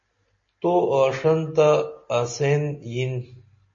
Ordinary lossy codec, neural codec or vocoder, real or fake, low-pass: MP3, 32 kbps; none; real; 7.2 kHz